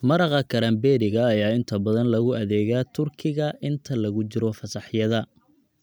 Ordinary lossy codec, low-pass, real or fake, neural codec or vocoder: none; none; real; none